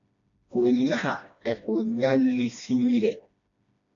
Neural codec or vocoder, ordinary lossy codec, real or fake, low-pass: codec, 16 kHz, 1 kbps, FreqCodec, smaller model; AAC, 64 kbps; fake; 7.2 kHz